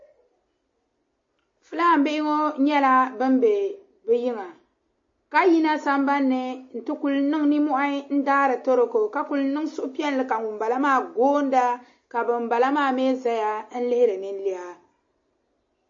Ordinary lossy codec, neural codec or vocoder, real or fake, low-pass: MP3, 32 kbps; none; real; 7.2 kHz